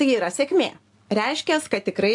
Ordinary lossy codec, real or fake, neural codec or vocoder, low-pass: AAC, 64 kbps; real; none; 10.8 kHz